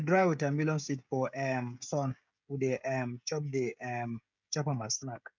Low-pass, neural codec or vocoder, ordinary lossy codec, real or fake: 7.2 kHz; codec, 16 kHz, 16 kbps, FreqCodec, smaller model; MP3, 64 kbps; fake